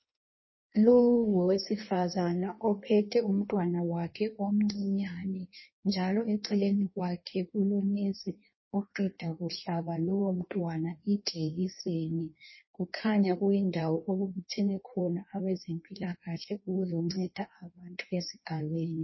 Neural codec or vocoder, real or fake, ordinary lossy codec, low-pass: codec, 16 kHz in and 24 kHz out, 1.1 kbps, FireRedTTS-2 codec; fake; MP3, 24 kbps; 7.2 kHz